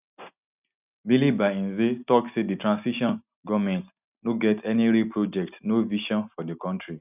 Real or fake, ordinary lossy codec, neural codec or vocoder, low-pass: real; none; none; 3.6 kHz